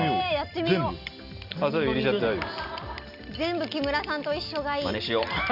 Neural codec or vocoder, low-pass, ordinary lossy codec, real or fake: none; 5.4 kHz; none; real